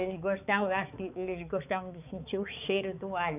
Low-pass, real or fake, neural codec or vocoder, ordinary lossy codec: 3.6 kHz; fake; codec, 16 kHz, 4 kbps, X-Codec, HuBERT features, trained on balanced general audio; none